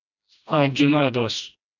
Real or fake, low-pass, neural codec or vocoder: fake; 7.2 kHz; codec, 16 kHz, 1 kbps, FreqCodec, smaller model